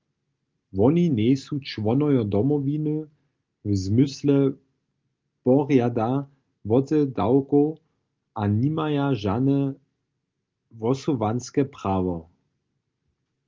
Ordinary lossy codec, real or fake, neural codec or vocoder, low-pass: Opus, 32 kbps; real; none; 7.2 kHz